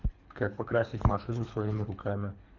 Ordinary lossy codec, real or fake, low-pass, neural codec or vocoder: MP3, 64 kbps; fake; 7.2 kHz; codec, 24 kHz, 3 kbps, HILCodec